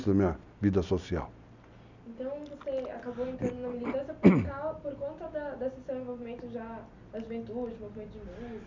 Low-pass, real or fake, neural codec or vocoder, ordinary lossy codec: 7.2 kHz; real; none; none